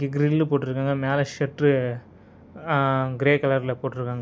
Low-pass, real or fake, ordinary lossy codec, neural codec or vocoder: none; real; none; none